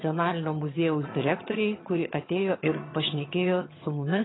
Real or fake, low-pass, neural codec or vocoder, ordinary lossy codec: fake; 7.2 kHz; vocoder, 22.05 kHz, 80 mel bands, HiFi-GAN; AAC, 16 kbps